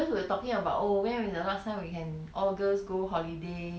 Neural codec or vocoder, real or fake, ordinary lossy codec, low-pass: none; real; none; none